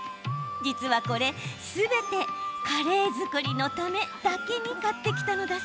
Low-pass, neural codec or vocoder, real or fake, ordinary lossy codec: none; none; real; none